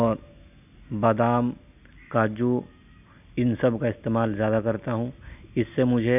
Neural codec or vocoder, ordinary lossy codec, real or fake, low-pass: none; none; real; 3.6 kHz